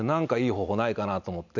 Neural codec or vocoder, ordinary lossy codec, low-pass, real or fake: none; none; 7.2 kHz; real